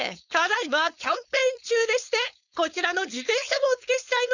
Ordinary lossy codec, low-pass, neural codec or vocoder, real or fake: none; 7.2 kHz; codec, 16 kHz, 4.8 kbps, FACodec; fake